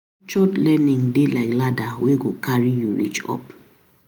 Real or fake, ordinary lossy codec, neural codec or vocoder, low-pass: real; none; none; none